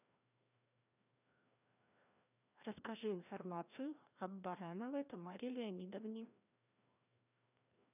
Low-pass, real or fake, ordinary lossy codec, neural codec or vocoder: 3.6 kHz; fake; none; codec, 16 kHz, 1 kbps, FreqCodec, larger model